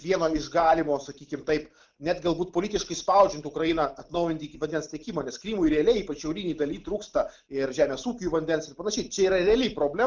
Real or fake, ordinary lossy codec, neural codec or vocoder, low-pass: real; Opus, 32 kbps; none; 7.2 kHz